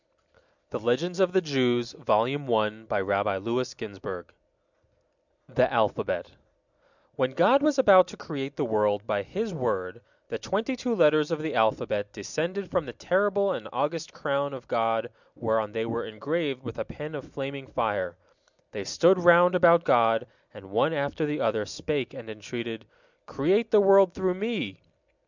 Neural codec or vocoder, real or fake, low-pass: none; real; 7.2 kHz